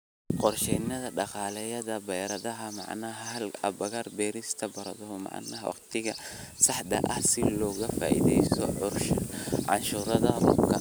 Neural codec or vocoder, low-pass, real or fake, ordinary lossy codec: none; none; real; none